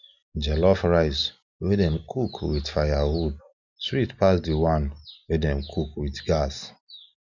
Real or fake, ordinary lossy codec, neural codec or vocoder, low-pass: real; none; none; 7.2 kHz